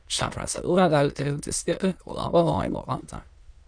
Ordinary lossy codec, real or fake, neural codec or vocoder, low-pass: Opus, 64 kbps; fake; autoencoder, 22.05 kHz, a latent of 192 numbers a frame, VITS, trained on many speakers; 9.9 kHz